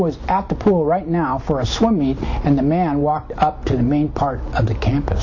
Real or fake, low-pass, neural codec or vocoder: real; 7.2 kHz; none